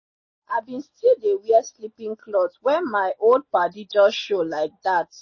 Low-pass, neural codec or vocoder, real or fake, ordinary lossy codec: 7.2 kHz; none; real; MP3, 32 kbps